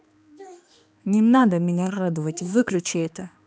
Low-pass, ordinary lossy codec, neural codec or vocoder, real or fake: none; none; codec, 16 kHz, 2 kbps, X-Codec, HuBERT features, trained on balanced general audio; fake